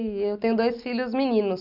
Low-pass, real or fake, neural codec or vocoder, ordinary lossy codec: 5.4 kHz; real; none; none